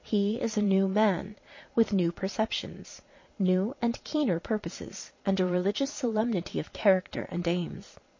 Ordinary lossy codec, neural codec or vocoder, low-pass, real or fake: MP3, 32 kbps; vocoder, 22.05 kHz, 80 mel bands, Vocos; 7.2 kHz; fake